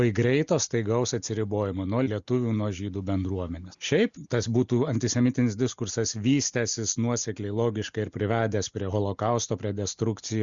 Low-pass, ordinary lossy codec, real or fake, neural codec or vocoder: 7.2 kHz; Opus, 64 kbps; real; none